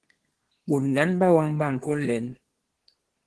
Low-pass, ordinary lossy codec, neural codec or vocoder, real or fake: 10.8 kHz; Opus, 16 kbps; codec, 24 kHz, 1 kbps, SNAC; fake